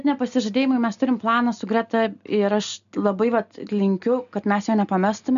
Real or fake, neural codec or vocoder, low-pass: real; none; 7.2 kHz